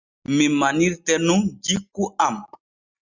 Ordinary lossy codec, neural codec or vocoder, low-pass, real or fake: Opus, 64 kbps; none; 7.2 kHz; real